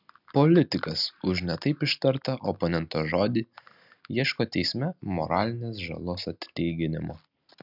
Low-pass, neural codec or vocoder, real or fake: 5.4 kHz; none; real